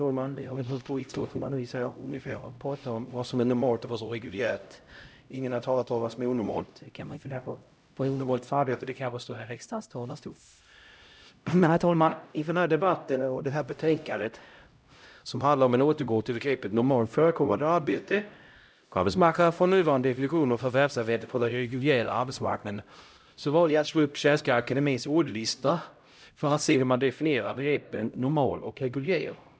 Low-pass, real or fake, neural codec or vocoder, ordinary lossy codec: none; fake; codec, 16 kHz, 0.5 kbps, X-Codec, HuBERT features, trained on LibriSpeech; none